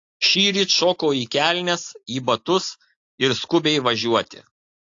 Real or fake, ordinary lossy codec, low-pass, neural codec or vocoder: real; AAC, 48 kbps; 7.2 kHz; none